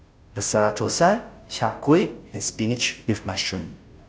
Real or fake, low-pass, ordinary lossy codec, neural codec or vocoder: fake; none; none; codec, 16 kHz, 0.5 kbps, FunCodec, trained on Chinese and English, 25 frames a second